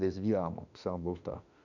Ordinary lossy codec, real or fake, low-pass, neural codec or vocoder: none; fake; 7.2 kHz; autoencoder, 48 kHz, 32 numbers a frame, DAC-VAE, trained on Japanese speech